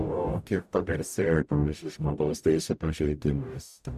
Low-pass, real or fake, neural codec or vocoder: 14.4 kHz; fake; codec, 44.1 kHz, 0.9 kbps, DAC